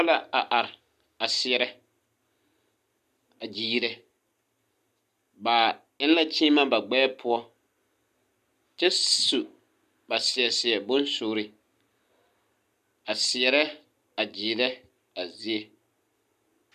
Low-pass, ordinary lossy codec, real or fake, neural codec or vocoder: 14.4 kHz; MP3, 96 kbps; real; none